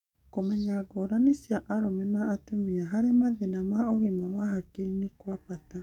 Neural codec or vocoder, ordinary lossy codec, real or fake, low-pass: codec, 44.1 kHz, 7.8 kbps, DAC; none; fake; 19.8 kHz